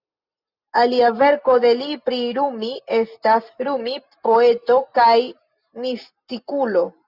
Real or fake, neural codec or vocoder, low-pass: real; none; 5.4 kHz